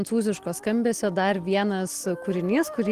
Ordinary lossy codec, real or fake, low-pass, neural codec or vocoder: Opus, 16 kbps; real; 14.4 kHz; none